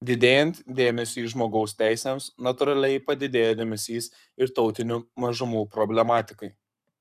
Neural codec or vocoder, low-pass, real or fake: codec, 44.1 kHz, 7.8 kbps, Pupu-Codec; 14.4 kHz; fake